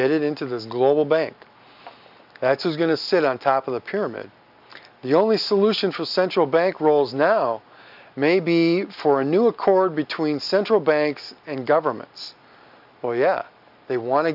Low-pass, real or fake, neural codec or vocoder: 5.4 kHz; real; none